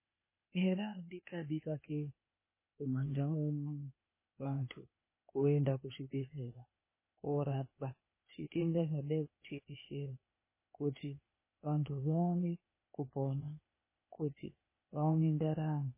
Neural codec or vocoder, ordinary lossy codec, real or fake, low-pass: codec, 16 kHz, 0.8 kbps, ZipCodec; MP3, 16 kbps; fake; 3.6 kHz